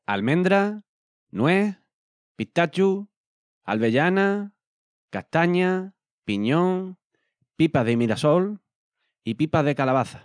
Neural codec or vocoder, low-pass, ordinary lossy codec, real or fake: none; 9.9 kHz; none; real